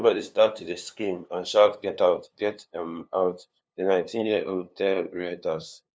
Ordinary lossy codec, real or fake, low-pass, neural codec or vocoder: none; fake; none; codec, 16 kHz, 2 kbps, FunCodec, trained on LibriTTS, 25 frames a second